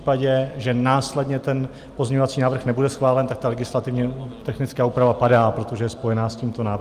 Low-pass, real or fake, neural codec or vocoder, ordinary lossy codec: 14.4 kHz; real; none; Opus, 32 kbps